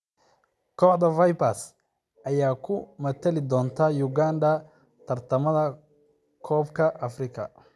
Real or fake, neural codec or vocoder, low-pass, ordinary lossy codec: real; none; none; none